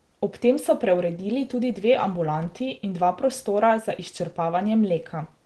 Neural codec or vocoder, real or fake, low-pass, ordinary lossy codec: none; real; 10.8 kHz; Opus, 16 kbps